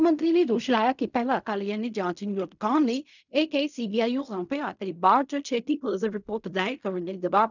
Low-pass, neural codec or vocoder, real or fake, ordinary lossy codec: 7.2 kHz; codec, 16 kHz in and 24 kHz out, 0.4 kbps, LongCat-Audio-Codec, fine tuned four codebook decoder; fake; none